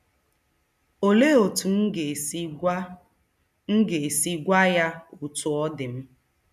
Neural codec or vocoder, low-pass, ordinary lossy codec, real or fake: none; 14.4 kHz; none; real